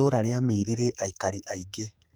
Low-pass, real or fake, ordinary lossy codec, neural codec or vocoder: none; fake; none; codec, 44.1 kHz, 2.6 kbps, SNAC